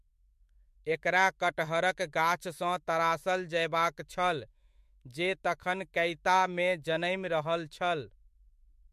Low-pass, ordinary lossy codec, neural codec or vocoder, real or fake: 14.4 kHz; MP3, 64 kbps; autoencoder, 48 kHz, 128 numbers a frame, DAC-VAE, trained on Japanese speech; fake